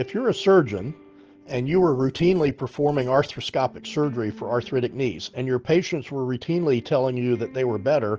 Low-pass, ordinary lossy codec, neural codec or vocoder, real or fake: 7.2 kHz; Opus, 16 kbps; none; real